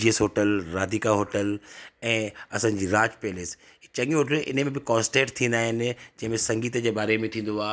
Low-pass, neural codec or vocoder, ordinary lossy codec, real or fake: none; none; none; real